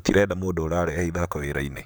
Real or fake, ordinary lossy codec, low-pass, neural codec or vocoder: fake; none; none; vocoder, 44.1 kHz, 128 mel bands, Pupu-Vocoder